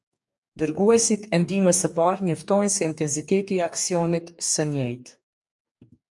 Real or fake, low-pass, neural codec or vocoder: fake; 10.8 kHz; codec, 44.1 kHz, 2.6 kbps, DAC